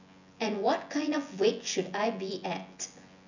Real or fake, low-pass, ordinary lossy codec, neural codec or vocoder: fake; 7.2 kHz; none; vocoder, 24 kHz, 100 mel bands, Vocos